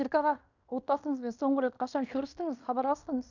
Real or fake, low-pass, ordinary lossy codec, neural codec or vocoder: fake; 7.2 kHz; none; codec, 16 kHz in and 24 kHz out, 0.9 kbps, LongCat-Audio-Codec, fine tuned four codebook decoder